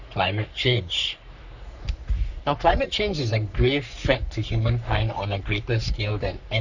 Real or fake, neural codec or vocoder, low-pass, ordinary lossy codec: fake; codec, 44.1 kHz, 3.4 kbps, Pupu-Codec; 7.2 kHz; none